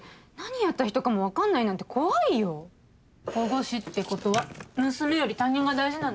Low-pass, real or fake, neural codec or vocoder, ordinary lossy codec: none; real; none; none